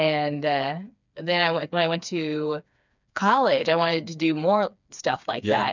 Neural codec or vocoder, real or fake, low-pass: codec, 16 kHz, 4 kbps, FreqCodec, smaller model; fake; 7.2 kHz